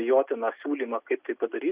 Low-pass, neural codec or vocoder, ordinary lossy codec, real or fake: 3.6 kHz; none; Opus, 64 kbps; real